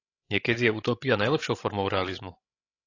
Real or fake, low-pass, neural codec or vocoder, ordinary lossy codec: fake; 7.2 kHz; codec, 16 kHz, 16 kbps, FreqCodec, larger model; AAC, 32 kbps